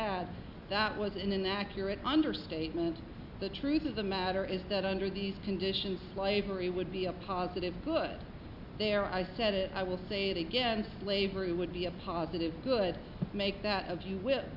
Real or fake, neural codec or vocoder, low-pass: real; none; 5.4 kHz